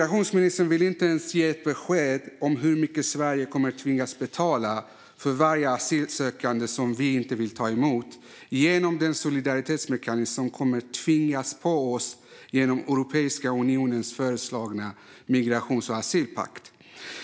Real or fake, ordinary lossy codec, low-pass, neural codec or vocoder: real; none; none; none